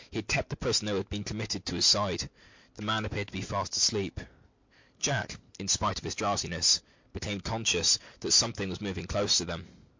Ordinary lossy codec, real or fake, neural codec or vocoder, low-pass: MP3, 48 kbps; real; none; 7.2 kHz